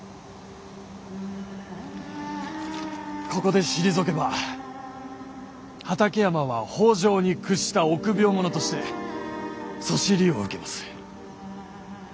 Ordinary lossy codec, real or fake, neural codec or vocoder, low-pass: none; real; none; none